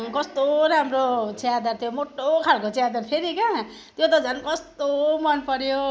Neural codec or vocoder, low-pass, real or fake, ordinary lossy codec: none; none; real; none